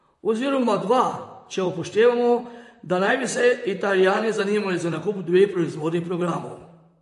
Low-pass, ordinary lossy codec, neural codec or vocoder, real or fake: 14.4 kHz; MP3, 48 kbps; vocoder, 44.1 kHz, 128 mel bands, Pupu-Vocoder; fake